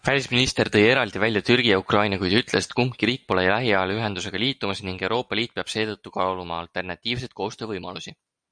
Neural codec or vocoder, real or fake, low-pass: none; real; 9.9 kHz